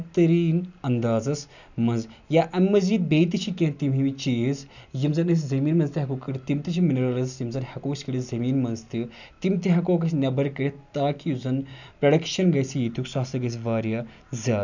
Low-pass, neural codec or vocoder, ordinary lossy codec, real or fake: 7.2 kHz; none; none; real